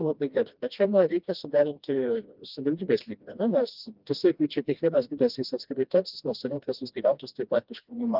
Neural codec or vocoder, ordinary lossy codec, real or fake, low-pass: codec, 16 kHz, 1 kbps, FreqCodec, smaller model; Opus, 32 kbps; fake; 5.4 kHz